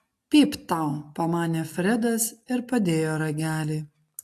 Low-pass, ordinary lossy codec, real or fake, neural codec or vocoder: 14.4 kHz; AAC, 64 kbps; real; none